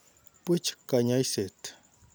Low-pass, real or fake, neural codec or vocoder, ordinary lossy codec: none; real; none; none